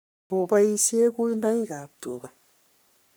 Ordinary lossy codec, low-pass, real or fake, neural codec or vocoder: none; none; fake; codec, 44.1 kHz, 3.4 kbps, Pupu-Codec